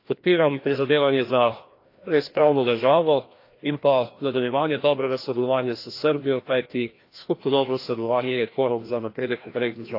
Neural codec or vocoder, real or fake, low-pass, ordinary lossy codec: codec, 16 kHz, 1 kbps, FreqCodec, larger model; fake; 5.4 kHz; AAC, 32 kbps